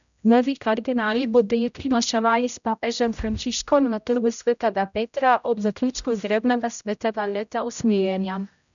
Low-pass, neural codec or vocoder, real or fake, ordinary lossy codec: 7.2 kHz; codec, 16 kHz, 0.5 kbps, X-Codec, HuBERT features, trained on general audio; fake; none